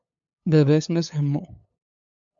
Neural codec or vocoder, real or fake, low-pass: codec, 16 kHz, 8 kbps, FunCodec, trained on LibriTTS, 25 frames a second; fake; 7.2 kHz